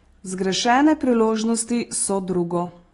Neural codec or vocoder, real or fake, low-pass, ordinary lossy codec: none; real; 10.8 kHz; AAC, 32 kbps